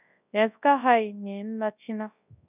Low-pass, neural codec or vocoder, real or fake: 3.6 kHz; codec, 24 kHz, 0.9 kbps, WavTokenizer, large speech release; fake